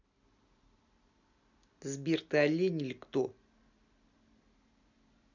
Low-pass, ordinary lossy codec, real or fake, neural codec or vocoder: 7.2 kHz; none; real; none